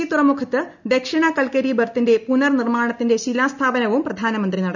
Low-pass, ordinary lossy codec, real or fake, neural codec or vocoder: 7.2 kHz; none; real; none